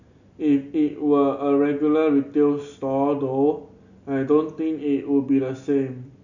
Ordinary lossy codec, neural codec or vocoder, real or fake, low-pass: none; none; real; 7.2 kHz